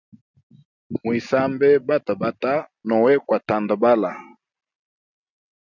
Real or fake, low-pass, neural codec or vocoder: real; 7.2 kHz; none